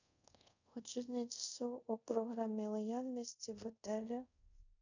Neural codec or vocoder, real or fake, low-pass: codec, 24 kHz, 0.5 kbps, DualCodec; fake; 7.2 kHz